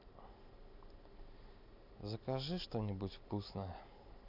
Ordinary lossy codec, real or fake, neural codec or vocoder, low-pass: none; real; none; 5.4 kHz